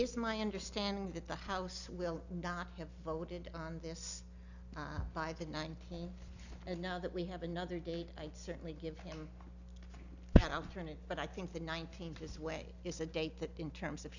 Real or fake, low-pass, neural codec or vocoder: real; 7.2 kHz; none